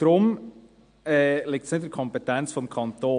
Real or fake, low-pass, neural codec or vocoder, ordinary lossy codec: real; 9.9 kHz; none; AAC, 64 kbps